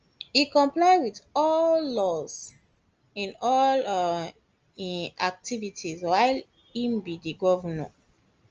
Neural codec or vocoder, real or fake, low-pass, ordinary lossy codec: none; real; 7.2 kHz; Opus, 24 kbps